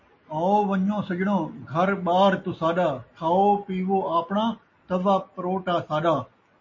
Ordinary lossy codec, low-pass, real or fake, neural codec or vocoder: MP3, 32 kbps; 7.2 kHz; real; none